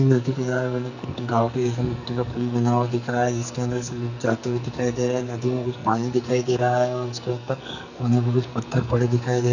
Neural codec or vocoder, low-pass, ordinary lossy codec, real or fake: codec, 44.1 kHz, 2.6 kbps, SNAC; 7.2 kHz; none; fake